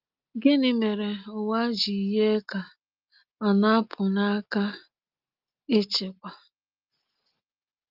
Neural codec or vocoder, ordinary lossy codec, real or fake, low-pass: none; Opus, 32 kbps; real; 5.4 kHz